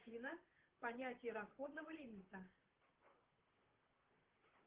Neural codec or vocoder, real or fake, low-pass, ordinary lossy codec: vocoder, 44.1 kHz, 128 mel bands, Pupu-Vocoder; fake; 3.6 kHz; Opus, 16 kbps